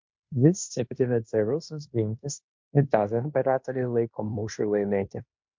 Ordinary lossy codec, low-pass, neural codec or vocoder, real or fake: MP3, 48 kbps; 7.2 kHz; codec, 16 kHz in and 24 kHz out, 0.9 kbps, LongCat-Audio-Codec, fine tuned four codebook decoder; fake